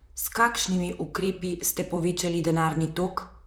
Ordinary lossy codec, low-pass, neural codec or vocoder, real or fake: none; none; vocoder, 44.1 kHz, 128 mel bands, Pupu-Vocoder; fake